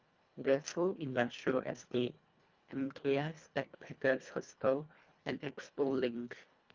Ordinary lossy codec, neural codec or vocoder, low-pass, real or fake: Opus, 24 kbps; codec, 24 kHz, 1.5 kbps, HILCodec; 7.2 kHz; fake